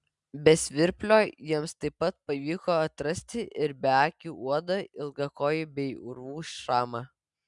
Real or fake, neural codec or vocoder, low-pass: real; none; 10.8 kHz